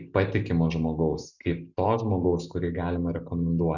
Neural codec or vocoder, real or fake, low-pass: none; real; 7.2 kHz